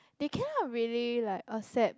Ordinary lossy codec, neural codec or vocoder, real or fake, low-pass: none; none; real; none